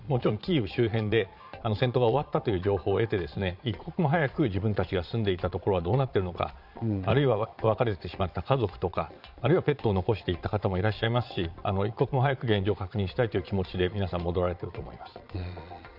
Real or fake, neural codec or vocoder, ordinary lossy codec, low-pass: fake; vocoder, 22.05 kHz, 80 mel bands, Vocos; none; 5.4 kHz